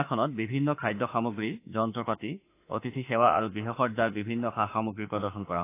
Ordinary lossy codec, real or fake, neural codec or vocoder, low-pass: AAC, 24 kbps; fake; autoencoder, 48 kHz, 32 numbers a frame, DAC-VAE, trained on Japanese speech; 3.6 kHz